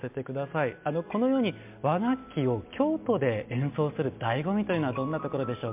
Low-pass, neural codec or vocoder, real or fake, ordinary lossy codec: 3.6 kHz; none; real; MP3, 32 kbps